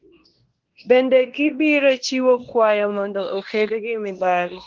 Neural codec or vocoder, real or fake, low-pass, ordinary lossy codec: codec, 16 kHz in and 24 kHz out, 0.9 kbps, LongCat-Audio-Codec, fine tuned four codebook decoder; fake; 7.2 kHz; Opus, 16 kbps